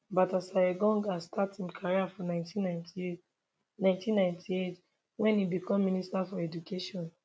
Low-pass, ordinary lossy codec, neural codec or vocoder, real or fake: none; none; none; real